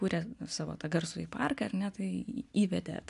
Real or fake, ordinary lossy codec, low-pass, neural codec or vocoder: real; AAC, 96 kbps; 10.8 kHz; none